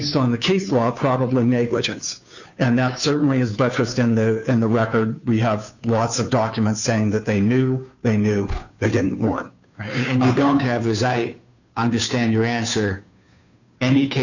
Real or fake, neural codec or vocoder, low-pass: fake; codec, 16 kHz, 2 kbps, FunCodec, trained on Chinese and English, 25 frames a second; 7.2 kHz